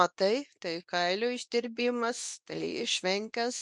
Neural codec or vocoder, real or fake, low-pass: codec, 24 kHz, 0.9 kbps, WavTokenizer, medium speech release version 2; fake; 10.8 kHz